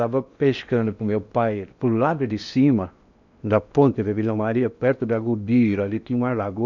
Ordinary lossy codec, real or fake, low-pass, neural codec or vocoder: none; fake; 7.2 kHz; codec, 16 kHz in and 24 kHz out, 0.8 kbps, FocalCodec, streaming, 65536 codes